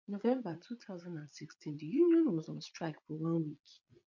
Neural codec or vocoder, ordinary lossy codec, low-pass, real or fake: vocoder, 22.05 kHz, 80 mel bands, Vocos; MP3, 48 kbps; 7.2 kHz; fake